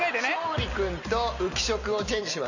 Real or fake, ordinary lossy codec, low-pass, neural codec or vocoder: real; none; 7.2 kHz; none